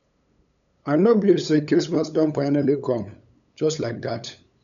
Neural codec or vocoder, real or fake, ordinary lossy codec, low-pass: codec, 16 kHz, 8 kbps, FunCodec, trained on LibriTTS, 25 frames a second; fake; none; 7.2 kHz